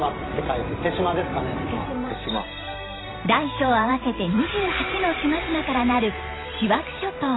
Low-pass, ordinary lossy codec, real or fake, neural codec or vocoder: 7.2 kHz; AAC, 16 kbps; fake; vocoder, 44.1 kHz, 128 mel bands every 512 samples, BigVGAN v2